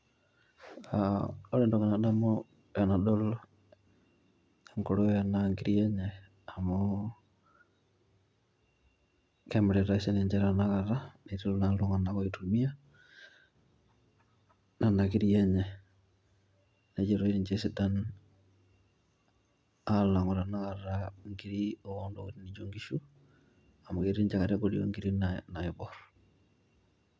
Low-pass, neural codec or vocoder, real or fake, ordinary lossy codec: none; none; real; none